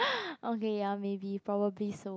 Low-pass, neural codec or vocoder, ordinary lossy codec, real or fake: none; none; none; real